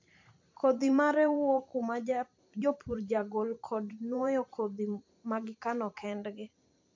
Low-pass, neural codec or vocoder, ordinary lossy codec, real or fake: 7.2 kHz; vocoder, 44.1 kHz, 128 mel bands every 512 samples, BigVGAN v2; MP3, 48 kbps; fake